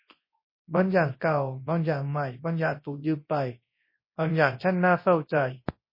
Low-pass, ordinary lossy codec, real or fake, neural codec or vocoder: 5.4 kHz; MP3, 24 kbps; fake; codec, 24 kHz, 0.9 kbps, WavTokenizer, large speech release